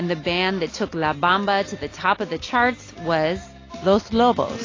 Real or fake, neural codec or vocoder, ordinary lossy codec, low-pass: real; none; AAC, 32 kbps; 7.2 kHz